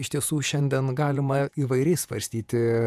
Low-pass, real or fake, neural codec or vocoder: 14.4 kHz; fake; vocoder, 48 kHz, 128 mel bands, Vocos